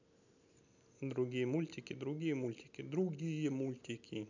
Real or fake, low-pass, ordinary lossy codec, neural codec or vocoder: real; 7.2 kHz; none; none